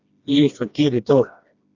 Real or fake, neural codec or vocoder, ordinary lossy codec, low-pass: fake; codec, 16 kHz, 1 kbps, FreqCodec, smaller model; Opus, 64 kbps; 7.2 kHz